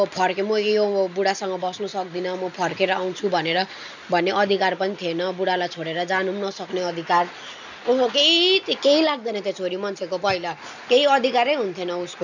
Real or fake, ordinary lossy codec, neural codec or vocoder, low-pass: real; none; none; 7.2 kHz